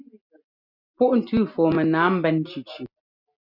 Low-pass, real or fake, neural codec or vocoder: 5.4 kHz; real; none